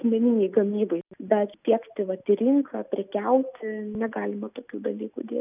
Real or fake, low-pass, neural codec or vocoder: real; 3.6 kHz; none